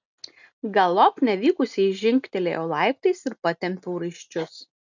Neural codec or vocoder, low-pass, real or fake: none; 7.2 kHz; real